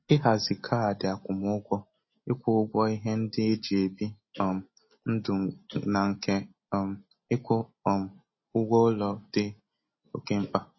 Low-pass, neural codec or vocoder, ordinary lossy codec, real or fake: 7.2 kHz; none; MP3, 24 kbps; real